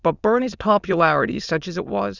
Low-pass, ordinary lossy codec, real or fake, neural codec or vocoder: 7.2 kHz; Opus, 64 kbps; fake; autoencoder, 22.05 kHz, a latent of 192 numbers a frame, VITS, trained on many speakers